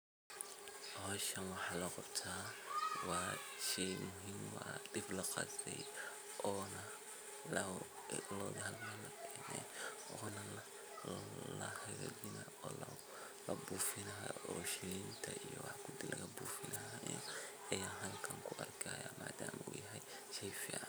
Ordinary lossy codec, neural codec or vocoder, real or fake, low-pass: none; none; real; none